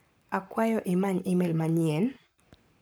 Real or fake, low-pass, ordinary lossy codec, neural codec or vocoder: fake; none; none; codec, 44.1 kHz, 7.8 kbps, Pupu-Codec